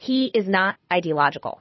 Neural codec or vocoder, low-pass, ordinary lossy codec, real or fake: codec, 24 kHz, 6 kbps, HILCodec; 7.2 kHz; MP3, 24 kbps; fake